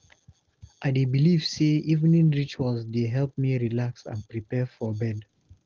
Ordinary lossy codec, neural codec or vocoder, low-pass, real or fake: Opus, 16 kbps; none; 7.2 kHz; real